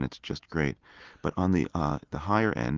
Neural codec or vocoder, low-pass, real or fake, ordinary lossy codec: none; 7.2 kHz; real; Opus, 32 kbps